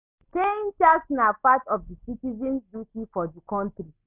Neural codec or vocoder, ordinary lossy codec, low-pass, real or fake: none; none; 3.6 kHz; real